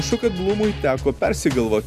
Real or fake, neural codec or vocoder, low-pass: real; none; 14.4 kHz